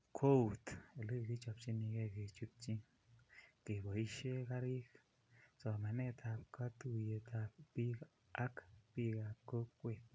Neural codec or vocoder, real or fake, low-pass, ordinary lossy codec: none; real; none; none